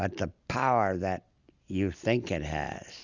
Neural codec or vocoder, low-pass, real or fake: none; 7.2 kHz; real